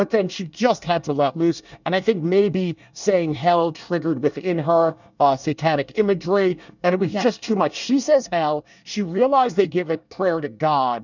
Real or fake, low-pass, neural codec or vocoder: fake; 7.2 kHz; codec, 24 kHz, 1 kbps, SNAC